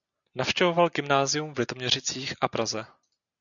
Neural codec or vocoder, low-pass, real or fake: none; 7.2 kHz; real